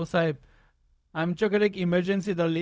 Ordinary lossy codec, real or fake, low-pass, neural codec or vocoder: none; fake; none; codec, 16 kHz, 0.4 kbps, LongCat-Audio-Codec